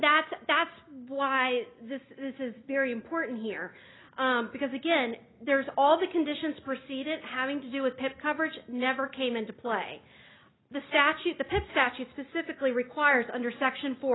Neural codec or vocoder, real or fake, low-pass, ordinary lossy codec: none; real; 7.2 kHz; AAC, 16 kbps